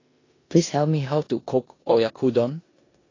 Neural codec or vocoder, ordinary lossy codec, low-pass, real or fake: codec, 16 kHz in and 24 kHz out, 0.9 kbps, LongCat-Audio-Codec, four codebook decoder; AAC, 32 kbps; 7.2 kHz; fake